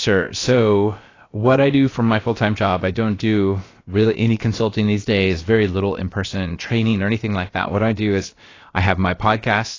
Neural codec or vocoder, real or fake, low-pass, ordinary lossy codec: codec, 16 kHz, about 1 kbps, DyCAST, with the encoder's durations; fake; 7.2 kHz; AAC, 32 kbps